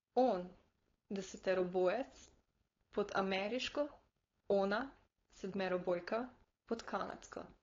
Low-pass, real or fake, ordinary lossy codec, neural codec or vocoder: 7.2 kHz; fake; AAC, 32 kbps; codec, 16 kHz, 4.8 kbps, FACodec